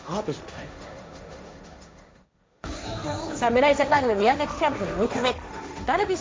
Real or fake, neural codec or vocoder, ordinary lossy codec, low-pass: fake; codec, 16 kHz, 1.1 kbps, Voila-Tokenizer; none; none